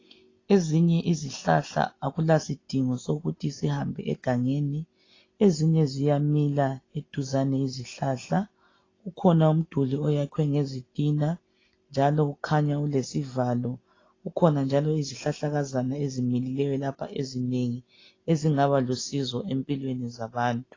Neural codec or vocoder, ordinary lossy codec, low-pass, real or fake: none; AAC, 32 kbps; 7.2 kHz; real